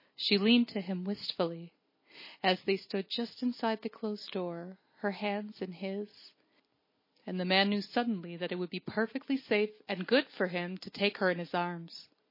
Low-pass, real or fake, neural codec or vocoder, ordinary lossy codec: 5.4 kHz; real; none; MP3, 24 kbps